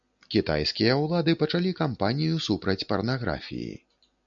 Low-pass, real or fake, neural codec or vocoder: 7.2 kHz; real; none